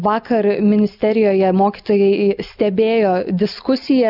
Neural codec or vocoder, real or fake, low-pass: none; real; 5.4 kHz